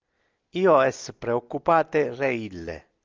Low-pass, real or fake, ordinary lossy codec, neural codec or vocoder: 7.2 kHz; real; Opus, 24 kbps; none